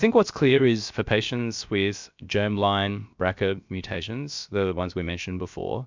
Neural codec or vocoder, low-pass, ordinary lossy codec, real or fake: codec, 16 kHz, about 1 kbps, DyCAST, with the encoder's durations; 7.2 kHz; MP3, 64 kbps; fake